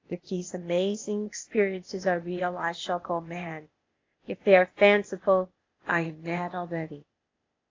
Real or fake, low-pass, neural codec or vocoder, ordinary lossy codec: fake; 7.2 kHz; codec, 16 kHz, 0.8 kbps, ZipCodec; AAC, 32 kbps